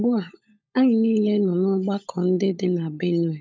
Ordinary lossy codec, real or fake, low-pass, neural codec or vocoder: none; fake; none; codec, 16 kHz, 16 kbps, FunCodec, trained on LibriTTS, 50 frames a second